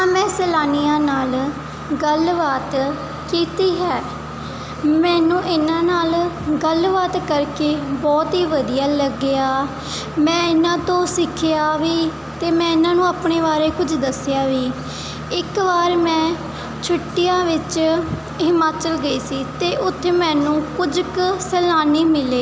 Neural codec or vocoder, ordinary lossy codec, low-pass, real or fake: none; none; none; real